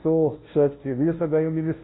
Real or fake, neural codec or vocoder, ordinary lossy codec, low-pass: fake; codec, 16 kHz, 0.5 kbps, FunCodec, trained on Chinese and English, 25 frames a second; AAC, 16 kbps; 7.2 kHz